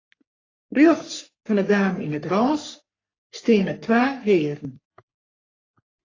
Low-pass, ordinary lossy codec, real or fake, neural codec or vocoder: 7.2 kHz; AAC, 32 kbps; fake; codec, 44.1 kHz, 3.4 kbps, Pupu-Codec